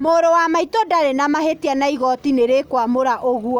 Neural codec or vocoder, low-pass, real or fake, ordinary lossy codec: none; 19.8 kHz; real; Opus, 64 kbps